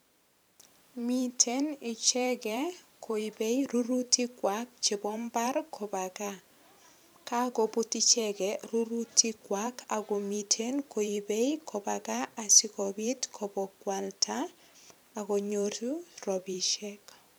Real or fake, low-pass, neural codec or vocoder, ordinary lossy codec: fake; none; vocoder, 44.1 kHz, 128 mel bands every 512 samples, BigVGAN v2; none